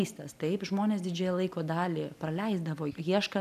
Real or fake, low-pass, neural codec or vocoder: real; 14.4 kHz; none